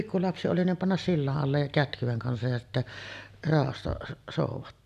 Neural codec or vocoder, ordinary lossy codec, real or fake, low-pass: none; none; real; 14.4 kHz